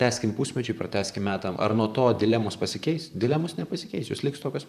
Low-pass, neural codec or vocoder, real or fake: 14.4 kHz; vocoder, 44.1 kHz, 128 mel bands, Pupu-Vocoder; fake